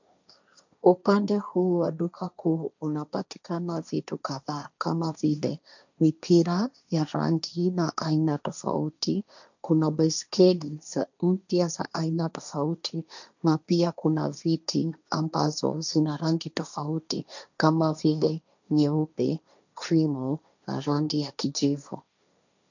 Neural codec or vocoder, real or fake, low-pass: codec, 16 kHz, 1.1 kbps, Voila-Tokenizer; fake; 7.2 kHz